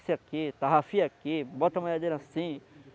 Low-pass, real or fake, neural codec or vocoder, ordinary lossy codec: none; real; none; none